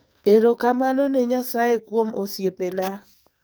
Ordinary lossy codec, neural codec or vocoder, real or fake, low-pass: none; codec, 44.1 kHz, 2.6 kbps, SNAC; fake; none